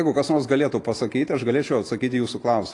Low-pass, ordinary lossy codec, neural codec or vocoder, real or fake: 10.8 kHz; AAC, 48 kbps; none; real